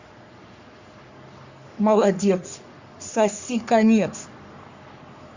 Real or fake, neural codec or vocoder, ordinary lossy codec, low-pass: fake; codec, 44.1 kHz, 3.4 kbps, Pupu-Codec; Opus, 64 kbps; 7.2 kHz